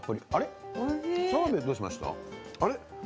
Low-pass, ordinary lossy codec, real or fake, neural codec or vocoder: none; none; real; none